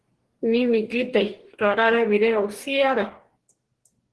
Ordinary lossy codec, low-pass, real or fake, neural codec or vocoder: Opus, 16 kbps; 10.8 kHz; fake; codec, 44.1 kHz, 2.6 kbps, DAC